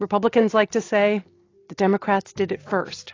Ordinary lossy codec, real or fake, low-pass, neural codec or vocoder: AAC, 32 kbps; real; 7.2 kHz; none